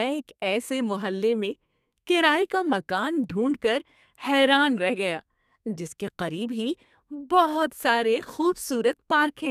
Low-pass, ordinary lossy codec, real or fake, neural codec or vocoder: 14.4 kHz; none; fake; codec, 32 kHz, 1.9 kbps, SNAC